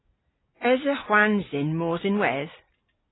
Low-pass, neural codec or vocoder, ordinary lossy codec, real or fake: 7.2 kHz; none; AAC, 16 kbps; real